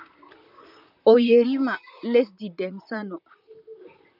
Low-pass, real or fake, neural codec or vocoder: 5.4 kHz; fake; vocoder, 44.1 kHz, 128 mel bands, Pupu-Vocoder